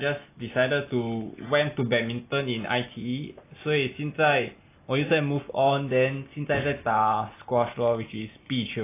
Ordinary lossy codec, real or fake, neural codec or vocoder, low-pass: AAC, 24 kbps; real; none; 3.6 kHz